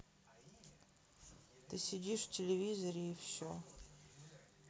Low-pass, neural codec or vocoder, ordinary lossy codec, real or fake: none; none; none; real